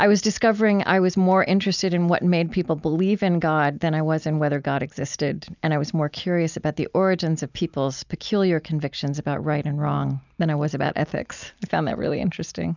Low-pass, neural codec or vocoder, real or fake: 7.2 kHz; none; real